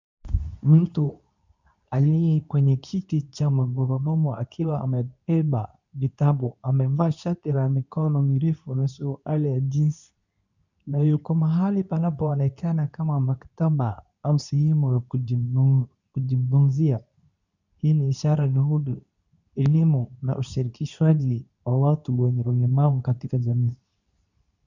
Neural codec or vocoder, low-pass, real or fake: codec, 24 kHz, 0.9 kbps, WavTokenizer, medium speech release version 2; 7.2 kHz; fake